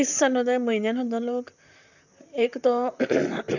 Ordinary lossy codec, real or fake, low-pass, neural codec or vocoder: none; fake; 7.2 kHz; vocoder, 44.1 kHz, 128 mel bands, Pupu-Vocoder